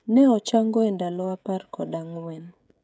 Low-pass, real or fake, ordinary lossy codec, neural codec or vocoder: none; fake; none; codec, 16 kHz, 16 kbps, FreqCodec, smaller model